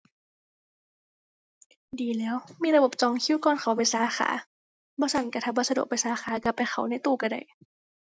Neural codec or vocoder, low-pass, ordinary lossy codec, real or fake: none; none; none; real